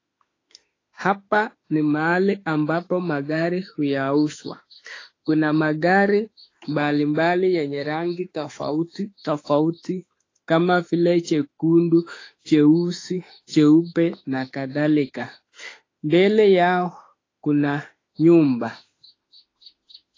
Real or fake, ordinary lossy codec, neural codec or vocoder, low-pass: fake; AAC, 32 kbps; autoencoder, 48 kHz, 32 numbers a frame, DAC-VAE, trained on Japanese speech; 7.2 kHz